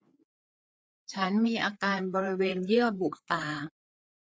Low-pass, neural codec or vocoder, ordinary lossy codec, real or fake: none; codec, 16 kHz, 4 kbps, FreqCodec, larger model; none; fake